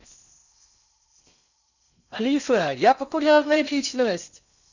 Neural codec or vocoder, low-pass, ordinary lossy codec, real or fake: codec, 16 kHz in and 24 kHz out, 0.6 kbps, FocalCodec, streaming, 4096 codes; 7.2 kHz; none; fake